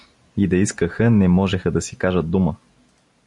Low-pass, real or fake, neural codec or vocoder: 10.8 kHz; real; none